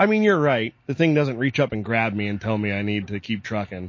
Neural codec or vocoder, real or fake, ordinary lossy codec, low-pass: none; real; MP3, 32 kbps; 7.2 kHz